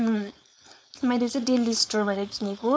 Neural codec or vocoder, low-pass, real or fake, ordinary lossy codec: codec, 16 kHz, 4.8 kbps, FACodec; none; fake; none